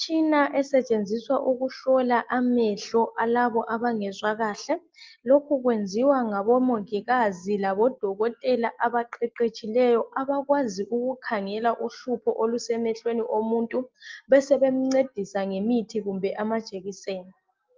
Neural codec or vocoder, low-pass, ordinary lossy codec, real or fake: none; 7.2 kHz; Opus, 32 kbps; real